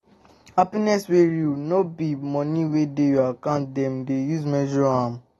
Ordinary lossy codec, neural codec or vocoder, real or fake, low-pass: AAC, 32 kbps; none; real; 19.8 kHz